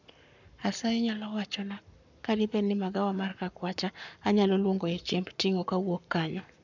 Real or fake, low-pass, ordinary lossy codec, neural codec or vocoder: fake; 7.2 kHz; none; codec, 44.1 kHz, 7.8 kbps, Pupu-Codec